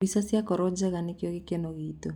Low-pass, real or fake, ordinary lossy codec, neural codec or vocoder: 19.8 kHz; real; none; none